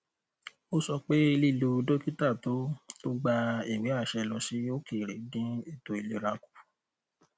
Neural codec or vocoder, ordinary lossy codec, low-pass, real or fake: none; none; none; real